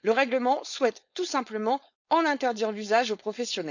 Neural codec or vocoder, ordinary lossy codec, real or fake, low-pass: codec, 16 kHz, 4.8 kbps, FACodec; none; fake; 7.2 kHz